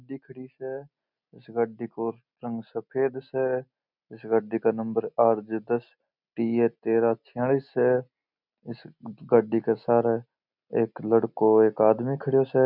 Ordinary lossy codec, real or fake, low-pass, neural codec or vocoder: none; real; 5.4 kHz; none